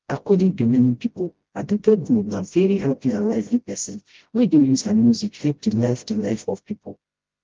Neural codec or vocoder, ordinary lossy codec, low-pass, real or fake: codec, 16 kHz, 0.5 kbps, FreqCodec, smaller model; Opus, 32 kbps; 7.2 kHz; fake